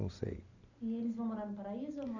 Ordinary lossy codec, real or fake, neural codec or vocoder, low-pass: none; real; none; 7.2 kHz